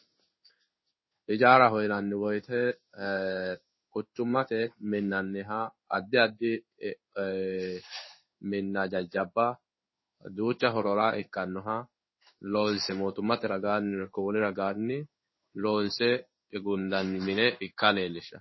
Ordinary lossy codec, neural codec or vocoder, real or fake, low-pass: MP3, 24 kbps; codec, 16 kHz in and 24 kHz out, 1 kbps, XY-Tokenizer; fake; 7.2 kHz